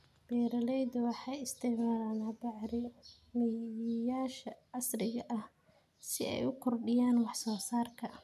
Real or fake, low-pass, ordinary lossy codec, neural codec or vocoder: real; 14.4 kHz; none; none